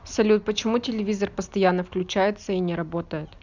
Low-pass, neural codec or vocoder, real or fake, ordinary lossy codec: 7.2 kHz; none; real; none